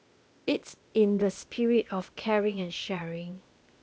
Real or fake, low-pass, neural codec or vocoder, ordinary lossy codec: fake; none; codec, 16 kHz, 0.8 kbps, ZipCodec; none